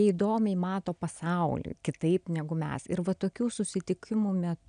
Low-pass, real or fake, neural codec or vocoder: 9.9 kHz; real; none